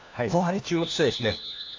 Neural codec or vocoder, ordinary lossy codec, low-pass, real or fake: codec, 16 kHz, 1 kbps, FunCodec, trained on LibriTTS, 50 frames a second; AAC, 48 kbps; 7.2 kHz; fake